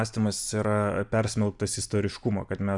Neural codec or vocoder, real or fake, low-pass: none; real; 10.8 kHz